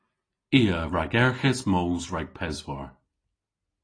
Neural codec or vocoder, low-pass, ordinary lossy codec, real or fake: none; 9.9 kHz; AAC, 32 kbps; real